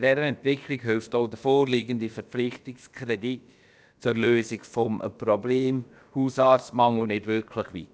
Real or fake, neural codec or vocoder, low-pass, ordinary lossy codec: fake; codec, 16 kHz, about 1 kbps, DyCAST, with the encoder's durations; none; none